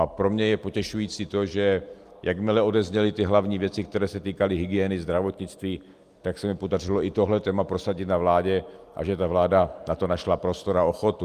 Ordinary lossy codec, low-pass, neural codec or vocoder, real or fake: Opus, 32 kbps; 14.4 kHz; none; real